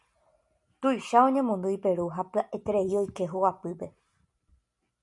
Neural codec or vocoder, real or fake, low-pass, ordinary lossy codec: none; real; 10.8 kHz; MP3, 96 kbps